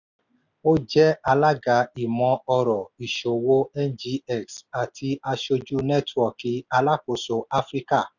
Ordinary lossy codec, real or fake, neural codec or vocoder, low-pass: none; real; none; 7.2 kHz